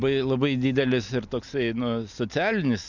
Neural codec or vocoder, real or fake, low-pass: none; real; 7.2 kHz